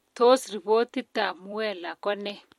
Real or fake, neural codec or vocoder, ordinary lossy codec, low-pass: fake; vocoder, 44.1 kHz, 128 mel bands every 256 samples, BigVGAN v2; MP3, 64 kbps; 19.8 kHz